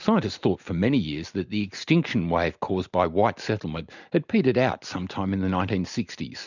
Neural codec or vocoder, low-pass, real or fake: none; 7.2 kHz; real